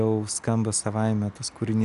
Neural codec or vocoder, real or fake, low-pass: none; real; 10.8 kHz